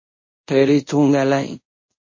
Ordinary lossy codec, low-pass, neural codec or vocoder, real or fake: MP3, 32 kbps; 7.2 kHz; codec, 24 kHz, 0.5 kbps, DualCodec; fake